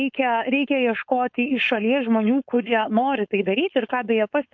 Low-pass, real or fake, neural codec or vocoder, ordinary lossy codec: 7.2 kHz; fake; codec, 16 kHz, 4.8 kbps, FACodec; MP3, 48 kbps